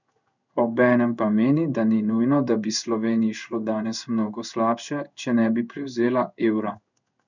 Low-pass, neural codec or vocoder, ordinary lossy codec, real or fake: 7.2 kHz; codec, 16 kHz in and 24 kHz out, 1 kbps, XY-Tokenizer; none; fake